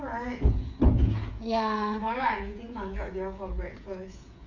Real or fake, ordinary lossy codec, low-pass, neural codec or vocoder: fake; MP3, 48 kbps; 7.2 kHz; codec, 16 kHz, 8 kbps, FreqCodec, smaller model